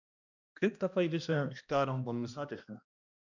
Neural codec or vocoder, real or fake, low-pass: codec, 16 kHz, 1 kbps, X-Codec, HuBERT features, trained on balanced general audio; fake; 7.2 kHz